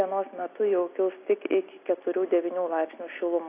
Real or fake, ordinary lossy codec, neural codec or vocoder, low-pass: real; AAC, 24 kbps; none; 3.6 kHz